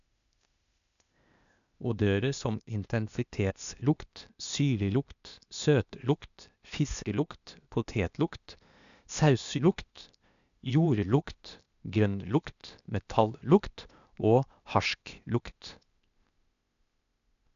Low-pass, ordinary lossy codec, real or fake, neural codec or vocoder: 7.2 kHz; none; fake; codec, 16 kHz, 0.8 kbps, ZipCodec